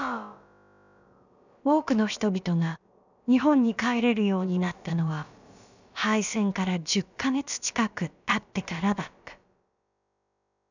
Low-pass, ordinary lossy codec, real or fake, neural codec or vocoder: 7.2 kHz; none; fake; codec, 16 kHz, about 1 kbps, DyCAST, with the encoder's durations